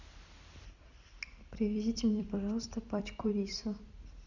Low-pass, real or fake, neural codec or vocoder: 7.2 kHz; fake; vocoder, 44.1 kHz, 128 mel bands every 256 samples, BigVGAN v2